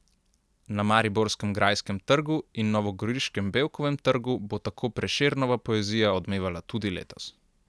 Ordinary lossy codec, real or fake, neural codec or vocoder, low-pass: none; real; none; none